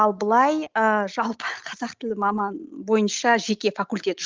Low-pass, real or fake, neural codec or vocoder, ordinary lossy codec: 7.2 kHz; real; none; Opus, 32 kbps